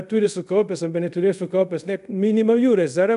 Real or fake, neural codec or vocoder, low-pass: fake; codec, 24 kHz, 0.5 kbps, DualCodec; 10.8 kHz